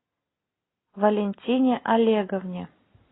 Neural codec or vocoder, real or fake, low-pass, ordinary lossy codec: none; real; 7.2 kHz; AAC, 16 kbps